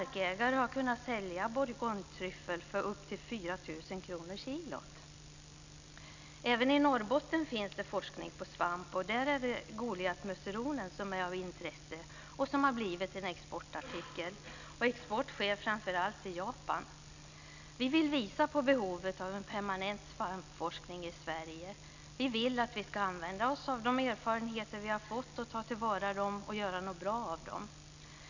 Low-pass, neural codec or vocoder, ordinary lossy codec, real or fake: 7.2 kHz; none; none; real